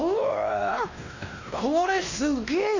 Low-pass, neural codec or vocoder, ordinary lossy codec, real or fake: 7.2 kHz; codec, 16 kHz, 1 kbps, X-Codec, WavLM features, trained on Multilingual LibriSpeech; none; fake